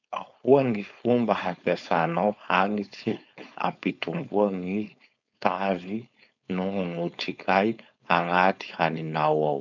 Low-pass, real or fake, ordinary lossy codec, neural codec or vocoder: 7.2 kHz; fake; none; codec, 16 kHz, 4.8 kbps, FACodec